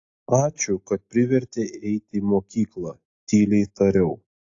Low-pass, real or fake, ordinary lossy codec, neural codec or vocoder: 7.2 kHz; real; AAC, 48 kbps; none